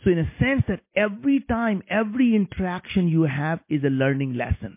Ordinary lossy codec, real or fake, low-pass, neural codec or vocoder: MP3, 24 kbps; real; 3.6 kHz; none